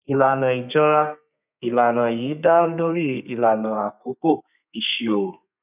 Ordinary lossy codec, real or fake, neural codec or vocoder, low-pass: none; fake; codec, 32 kHz, 1.9 kbps, SNAC; 3.6 kHz